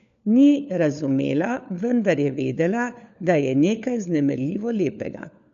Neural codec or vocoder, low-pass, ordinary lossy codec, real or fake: codec, 16 kHz, 4 kbps, FunCodec, trained on LibriTTS, 50 frames a second; 7.2 kHz; none; fake